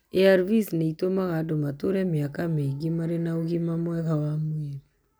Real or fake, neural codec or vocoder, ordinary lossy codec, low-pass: real; none; none; none